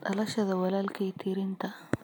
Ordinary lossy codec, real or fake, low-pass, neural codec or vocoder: none; real; none; none